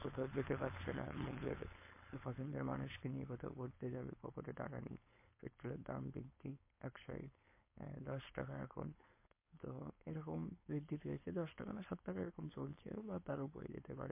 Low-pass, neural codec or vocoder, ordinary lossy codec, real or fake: 3.6 kHz; codec, 16 kHz, 4.8 kbps, FACodec; MP3, 24 kbps; fake